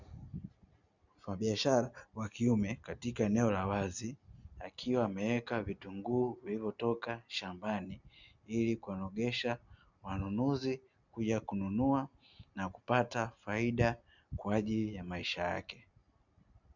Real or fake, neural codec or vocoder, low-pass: real; none; 7.2 kHz